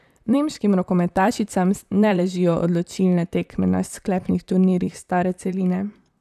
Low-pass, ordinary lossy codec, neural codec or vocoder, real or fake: 14.4 kHz; none; vocoder, 44.1 kHz, 128 mel bands, Pupu-Vocoder; fake